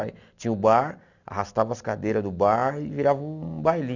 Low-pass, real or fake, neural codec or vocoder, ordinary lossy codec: 7.2 kHz; real; none; none